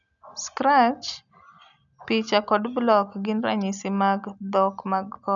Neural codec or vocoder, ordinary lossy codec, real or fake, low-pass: none; none; real; 7.2 kHz